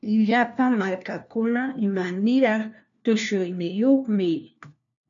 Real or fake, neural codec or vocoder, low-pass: fake; codec, 16 kHz, 1 kbps, FunCodec, trained on LibriTTS, 50 frames a second; 7.2 kHz